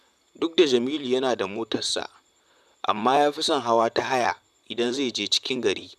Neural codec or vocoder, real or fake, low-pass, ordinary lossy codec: vocoder, 44.1 kHz, 128 mel bands, Pupu-Vocoder; fake; 14.4 kHz; none